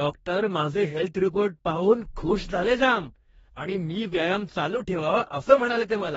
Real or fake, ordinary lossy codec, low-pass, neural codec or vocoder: fake; AAC, 24 kbps; 19.8 kHz; codec, 44.1 kHz, 2.6 kbps, DAC